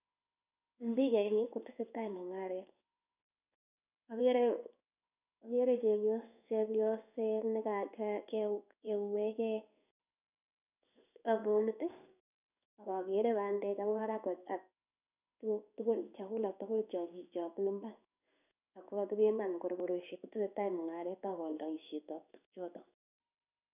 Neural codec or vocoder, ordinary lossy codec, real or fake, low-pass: codec, 16 kHz in and 24 kHz out, 1 kbps, XY-Tokenizer; none; fake; 3.6 kHz